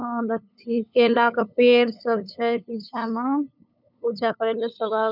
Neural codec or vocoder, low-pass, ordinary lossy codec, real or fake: codec, 16 kHz, 8 kbps, FunCodec, trained on LibriTTS, 25 frames a second; 5.4 kHz; none; fake